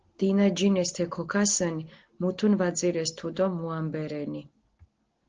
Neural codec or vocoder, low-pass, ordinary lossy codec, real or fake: none; 7.2 kHz; Opus, 16 kbps; real